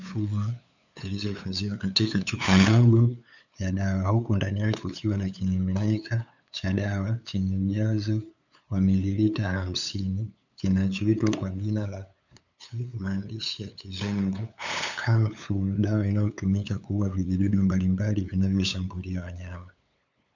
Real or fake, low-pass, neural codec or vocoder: fake; 7.2 kHz; codec, 16 kHz, 8 kbps, FunCodec, trained on LibriTTS, 25 frames a second